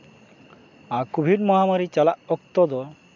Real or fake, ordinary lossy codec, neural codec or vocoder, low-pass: real; MP3, 64 kbps; none; 7.2 kHz